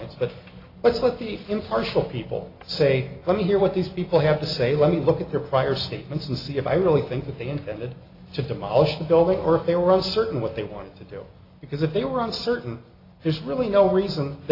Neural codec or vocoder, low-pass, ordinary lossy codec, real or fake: none; 5.4 kHz; AAC, 32 kbps; real